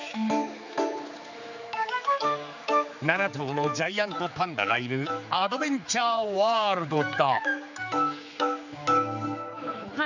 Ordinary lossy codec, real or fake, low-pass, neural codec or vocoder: none; fake; 7.2 kHz; codec, 16 kHz, 4 kbps, X-Codec, HuBERT features, trained on general audio